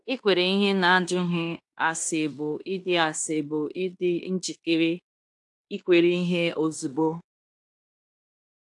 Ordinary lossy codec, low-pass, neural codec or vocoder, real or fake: AAC, 64 kbps; 10.8 kHz; codec, 16 kHz in and 24 kHz out, 0.9 kbps, LongCat-Audio-Codec, fine tuned four codebook decoder; fake